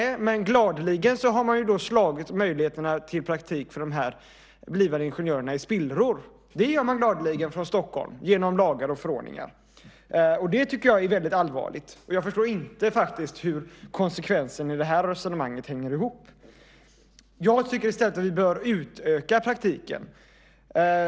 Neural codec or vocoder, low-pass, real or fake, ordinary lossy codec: none; none; real; none